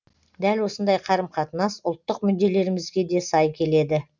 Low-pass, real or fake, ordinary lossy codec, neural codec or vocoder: 7.2 kHz; real; none; none